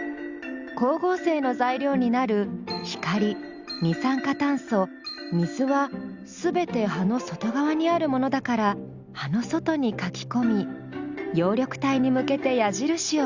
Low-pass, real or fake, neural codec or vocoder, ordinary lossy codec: 7.2 kHz; real; none; Opus, 64 kbps